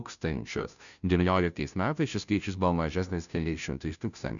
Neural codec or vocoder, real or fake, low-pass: codec, 16 kHz, 0.5 kbps, FunCodec, trained on Chinese and English, 25 frames a second; fake; 7.2 kHz